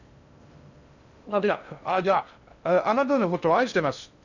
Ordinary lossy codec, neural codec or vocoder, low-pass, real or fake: none; codec, 16 kHz in and 24 kHz out, 0.6 kbps, FocalCodec, streaming, 2048 codes; 7.2 kHz; fake